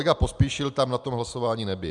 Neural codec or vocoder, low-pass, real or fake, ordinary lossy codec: none; 10.8 kHz; real; MP3, 96 kbps